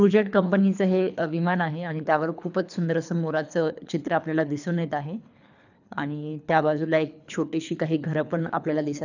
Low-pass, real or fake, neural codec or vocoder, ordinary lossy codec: 7.2 kHz; fake; codec, 24 kHz, 3 kbps, HILCodec; none